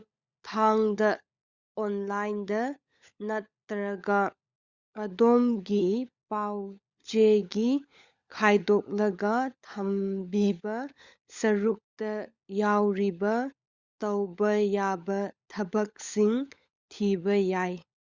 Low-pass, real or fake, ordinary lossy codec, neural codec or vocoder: 7.2 kHz; fake; Opus, 64 kbps; codec, 16 kHz, 16 kbps, FunCodec, trained on LibriTTS, 50 frames a second